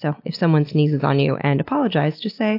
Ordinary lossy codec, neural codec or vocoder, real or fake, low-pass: AAC, 32 kbps; none; real; 5.4 kHz